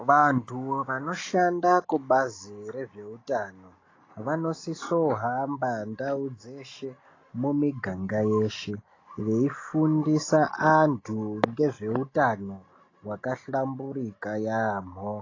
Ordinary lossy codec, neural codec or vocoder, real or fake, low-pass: AAC, 32 kbps; none; real; 7.2 kHz